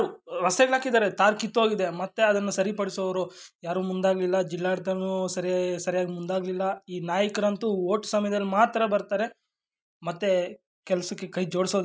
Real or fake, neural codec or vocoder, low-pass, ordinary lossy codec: real; none; none; none